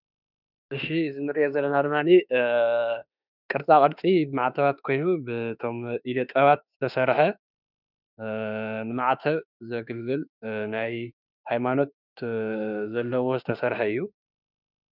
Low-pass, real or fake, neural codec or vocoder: 5.4 kHz; fake; autoencoder, 48 kHz, 32 numbers a frame, DAC-VAE, trained on Japanese speech